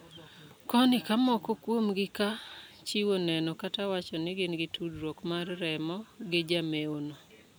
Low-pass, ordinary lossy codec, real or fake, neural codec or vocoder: none; none; real; none